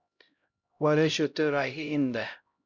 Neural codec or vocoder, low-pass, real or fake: codec, 16 kHz, 0.5 kbps, X-Codec, HuBERT features, trained on LibriSpeech; 7.2 kHz; fake